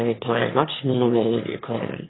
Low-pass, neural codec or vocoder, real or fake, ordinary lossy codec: 7.2 kHz; autoencoder, 22.05 kHz, a latent of 192 numbers a frame, VITS, trained on one speaker; fake; AAC, 16 kbps